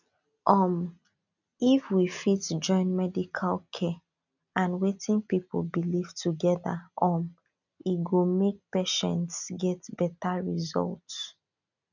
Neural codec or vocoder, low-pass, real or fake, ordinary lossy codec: none; 7.2 kHz; real; none